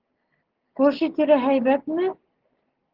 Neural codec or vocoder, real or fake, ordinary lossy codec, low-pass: none; real; Opus, 16 kbps; 5.4 kHz